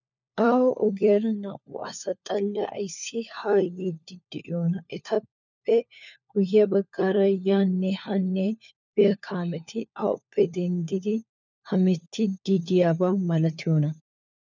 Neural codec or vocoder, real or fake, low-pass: codec, 16 kHz, 4 kbps, FunCodec, trained on LibriTTS, 50 frames a second; fake; 7.2 kHz